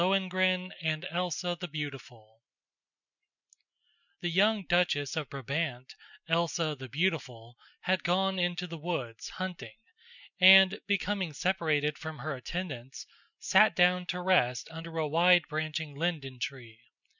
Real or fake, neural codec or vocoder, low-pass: real; none; 7.2 kHz